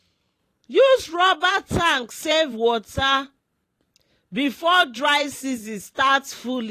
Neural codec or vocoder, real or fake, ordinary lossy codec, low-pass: vocoder, 44.1 kHz, 128 mel bands every 512 samples, BigVGAN v2; fake; AAC, 48 kbps; 14.4 kHz